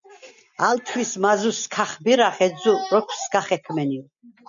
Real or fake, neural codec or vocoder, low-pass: real; none; 7.2 kHz